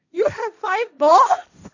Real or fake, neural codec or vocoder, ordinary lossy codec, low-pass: fake; codec, 16 kHz, 1.1 kbps, Voila-Tokenizer; none; 7.2 kHz